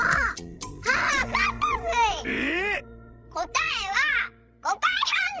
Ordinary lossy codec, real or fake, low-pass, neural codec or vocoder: none; fake; none; codec, 16 kHz, 16 kbps, FreqCodec, larger model